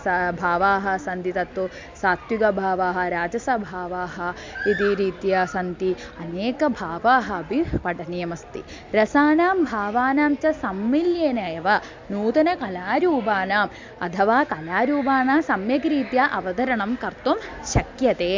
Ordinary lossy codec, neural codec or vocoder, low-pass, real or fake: MP3, 64 kbps; none; 7.2 kHz; real